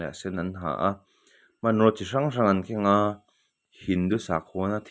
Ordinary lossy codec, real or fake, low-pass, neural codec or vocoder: none; real; none; none